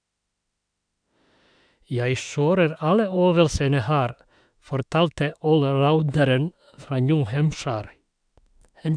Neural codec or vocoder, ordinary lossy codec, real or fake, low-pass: autoencoder, 48 kHz, 32 numbers a frame, DAC-VAE, trained on Japanese speech; none; fake; 9.9 kHz